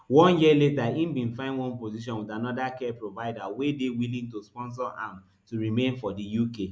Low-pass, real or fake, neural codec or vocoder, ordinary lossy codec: none; real; none; none